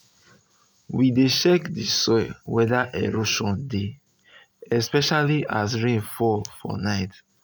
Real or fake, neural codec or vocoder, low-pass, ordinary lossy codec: real; none; none; none